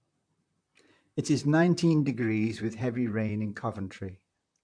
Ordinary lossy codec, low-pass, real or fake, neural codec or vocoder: MP3, 64 kbps; 9.9 kHz; fake; vocoder, 44.1 kHz, 128 mel bands, Pupu-Vocoder